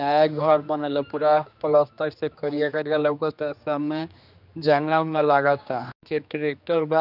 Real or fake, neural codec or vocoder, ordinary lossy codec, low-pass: fake; codec, 16 kHz, 2 kbps, X-Codec, HuBERT features, trained on general audio; none; 5.4 kHz